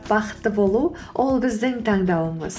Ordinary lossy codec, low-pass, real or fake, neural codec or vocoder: none; none; real; none